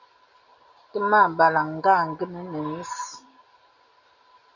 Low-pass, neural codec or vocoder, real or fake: 7.2 kHz; none; real